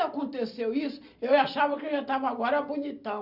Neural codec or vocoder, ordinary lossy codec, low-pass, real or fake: none; none; 5.4 kHz; real